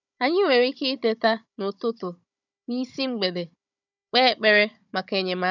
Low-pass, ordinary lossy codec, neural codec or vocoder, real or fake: 7.2 kHz; none; codec, 16 kHz, 16 kbps, FunCodec, trained on Chinese and English, 50 frames a second; fake